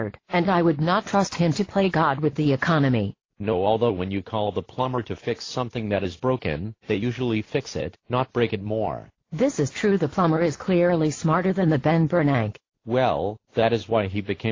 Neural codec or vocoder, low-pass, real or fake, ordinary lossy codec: none; 7.2 kHz; real; AAC, 32 kbps